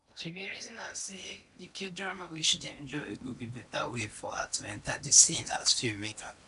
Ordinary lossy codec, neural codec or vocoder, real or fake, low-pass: none; codec, 16 kHz in and 24 kHz out, 0.8 kbps, FocalCodec, streaming, 65536 codes; fake; 10.8 kHz